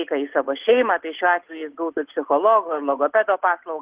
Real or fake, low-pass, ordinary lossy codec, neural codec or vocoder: real; 3.6 kHz; Opus, 16 kbps; none